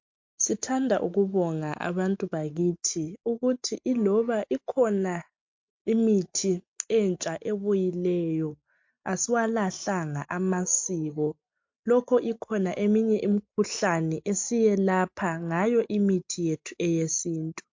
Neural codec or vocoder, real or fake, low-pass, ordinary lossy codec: none; real; 7.2 kHz; MP3, 48 kbps